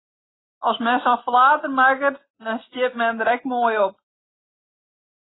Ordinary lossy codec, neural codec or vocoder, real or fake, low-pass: AAC, 16 kbps; none; real; 7.2 kHz